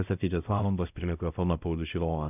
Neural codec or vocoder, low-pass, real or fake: codec, 16 kHz, 0.5 kbps, X-Codec, WavLM features, trained on Multilingual LibriSpeech; 3.6 kHz; fake